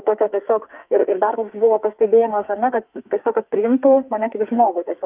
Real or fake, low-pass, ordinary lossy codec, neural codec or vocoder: fake; 3.6 kHz; Opus, 32 kbps; codec, 44.1 kHz, 2.6 kbps, SNAC